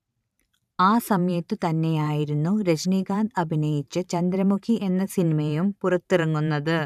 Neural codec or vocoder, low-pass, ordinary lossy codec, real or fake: vocoder, 44.1 kHz, 128 mel bands every 512 samples, BigVGAN v2; 14.4 kHz; none; fake